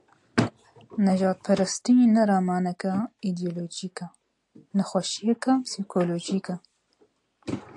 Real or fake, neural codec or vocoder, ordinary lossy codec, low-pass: real; none; AAC, 64 kbps; 10.8 kHz